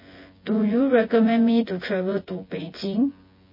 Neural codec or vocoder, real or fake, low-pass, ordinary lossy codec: vocoder, 24 kHz, 100 mel bands, Vocos; fake; 5.4 kHz; MP3, 24 kbps